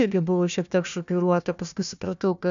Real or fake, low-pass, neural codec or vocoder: fake; 7.2 kHz; codec, 16 kHz, 1 kbps, FunCodec, trained on Chinese and English, 50 frames a second